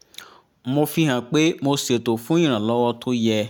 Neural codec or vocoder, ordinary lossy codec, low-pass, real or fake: none; none; 19.8 kHz; real